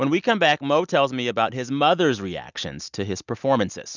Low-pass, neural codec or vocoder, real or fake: 7.2 kHz; none; real